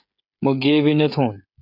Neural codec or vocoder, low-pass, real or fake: codec, 16 kHz, 16 kbps, FreqCodec, smaller model; 5.4 kHz; fake